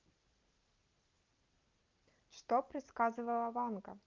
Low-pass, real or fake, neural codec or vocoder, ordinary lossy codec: 7.2 kHz; real; none; Opus, 24 kbps